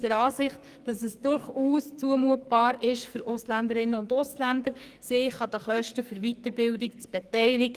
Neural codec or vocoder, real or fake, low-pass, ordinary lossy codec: codec, 44.1 kHz, 2.6 kbps, SNAC; fake; 14.4 kHz; Opus, 32 kbps